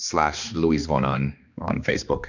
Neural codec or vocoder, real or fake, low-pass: codec, 16 kHz, 2 kbps, X-Codec, HuBERT features, trained on balanced general audio; fake; 7.2 kHz